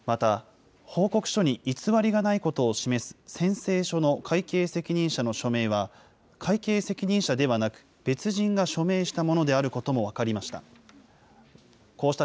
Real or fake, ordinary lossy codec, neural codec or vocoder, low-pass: real; none; none; none